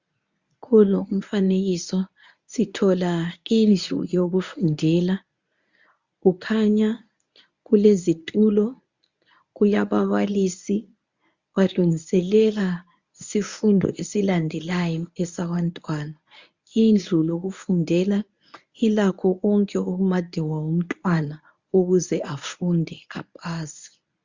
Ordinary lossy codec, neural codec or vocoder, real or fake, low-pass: Opus, 64 kbps; codec, 24 kHz, 0.9 kbps, WavTokenizer, medium speech release version 1; fake; 7.2 kHz